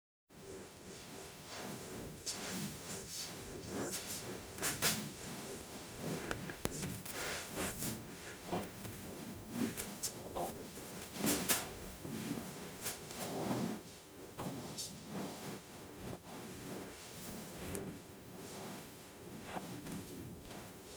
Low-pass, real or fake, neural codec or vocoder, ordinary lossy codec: none; fake; codec, 44.1 kHz, 0.9 kbps, DAC; none